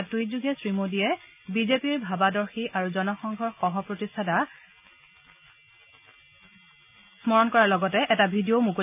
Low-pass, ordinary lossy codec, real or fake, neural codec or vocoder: 3.6 kHz; none; real; none